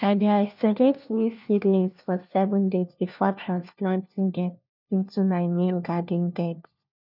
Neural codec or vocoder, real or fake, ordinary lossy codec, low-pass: codec, 16 kHz, 1 kbps, FunCodec, trained on LibriTTS, 50 frames a second; fake; none; 5.4 kHz